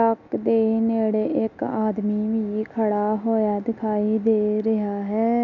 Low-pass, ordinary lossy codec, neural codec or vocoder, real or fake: 7.2 kHz; none; none; real